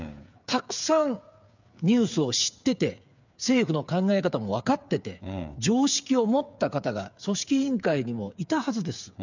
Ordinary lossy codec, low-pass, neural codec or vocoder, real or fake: none; 7.2 kHz; codec, 16 kHz, 8 kbps, FreqCodec, smaller model; fake